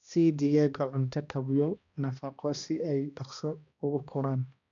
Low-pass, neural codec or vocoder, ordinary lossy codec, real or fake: 7.2 kHz; codec, 16 kHz, 1 kbps, X-Codec, HuBERT features, trained on balanced general audio; MP3, 96 kbps; fake